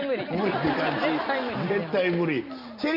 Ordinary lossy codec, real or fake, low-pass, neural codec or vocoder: none; real; 5.4 kHz; none